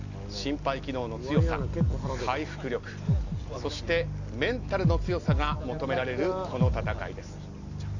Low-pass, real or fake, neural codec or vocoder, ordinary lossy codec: 7.2 kHz; real; none; none